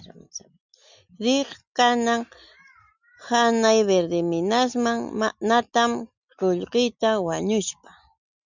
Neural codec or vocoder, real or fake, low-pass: none; real; 7.2 kHz